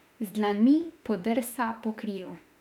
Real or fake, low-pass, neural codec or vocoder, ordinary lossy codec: fake; 19.8 kHz; autoencoder, 48 kHz, 32 numbers a frame, DAC-VAE, trained on Japanese speech; none